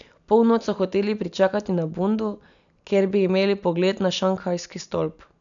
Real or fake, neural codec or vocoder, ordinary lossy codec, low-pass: real; none; none; 7.2 kHz